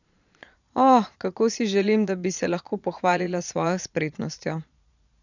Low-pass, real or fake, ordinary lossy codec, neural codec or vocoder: 7.2 kHz; fake; none; vocoder, 22.05 kHz, 80 mel bands, Vocos